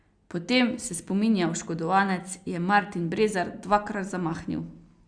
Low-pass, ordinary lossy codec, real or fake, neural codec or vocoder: 9.9 kHz; Opus, 64 kbps; real; none